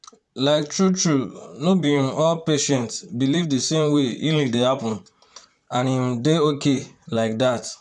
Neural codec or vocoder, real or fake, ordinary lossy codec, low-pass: vocoder, 44.1 kHz, 128 mel bands, Pupu-Vocoder; fake; none; 10.8 kHz